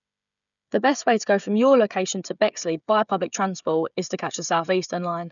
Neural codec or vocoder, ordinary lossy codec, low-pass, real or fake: codec, 16 kHz, 16 kbps, FreqCodec, smaller model; none; 7.2 kHz; fake